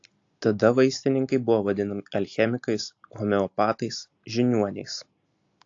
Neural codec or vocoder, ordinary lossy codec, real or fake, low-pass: none; AAC, 48 kbps; real; 7.2 kHz